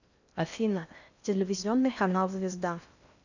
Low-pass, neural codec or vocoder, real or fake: 7.2 kHz; codec, 16 kHz in and 24 kHz out, 0.6 kbps, FocalCodec, streaming, 4096 codes; fake